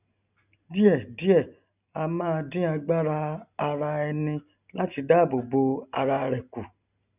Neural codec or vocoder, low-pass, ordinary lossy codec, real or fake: none; 3.6 kHz; none; real